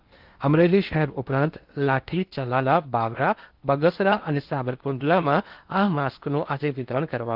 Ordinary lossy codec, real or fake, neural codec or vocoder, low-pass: Opus, 32 kbps; fake; codec, 16 kHz in and 24 kHz out, 0.8 kbps, FocalCodec, streaming, 65536 codes; 5.4 kHz